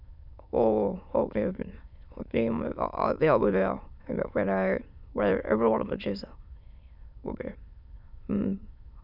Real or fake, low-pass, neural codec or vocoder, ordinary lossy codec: fake; 5.4 kHz; autoencoder, 22.05 kHz, a latent of 192 numbers a frame, VITS, trained on many speakers; none